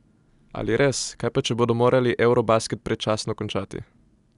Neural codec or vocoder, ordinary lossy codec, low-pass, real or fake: none; MP3, 96 kbps; 10.8 kHz; real